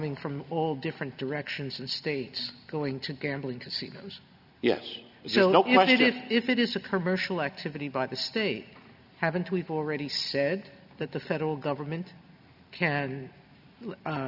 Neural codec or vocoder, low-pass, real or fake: none; 5.4 kHz; real